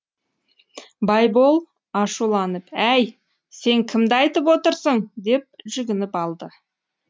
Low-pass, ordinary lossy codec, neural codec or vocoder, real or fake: none; none; none; real